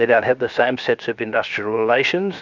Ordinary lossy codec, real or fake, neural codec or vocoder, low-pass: Opus, 64 kbps; fake; codec, 16 kHz, 0.7 kbps, FocalCodec; 7.2 kHz